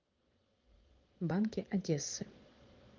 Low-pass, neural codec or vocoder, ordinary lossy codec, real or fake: 7.2 kHz; vocoder, 22.05 kHz, 80 mel bands, Vocos; Opus, 32 kbps; fake